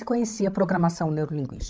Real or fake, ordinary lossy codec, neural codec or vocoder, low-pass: fake; none; codec, 16 kHz, 16 kbps, FreqCodec, larger model; none